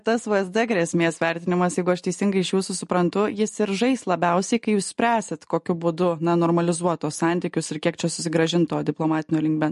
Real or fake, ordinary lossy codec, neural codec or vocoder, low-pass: real; MP3, 48 kbps; none; 14.4 kHz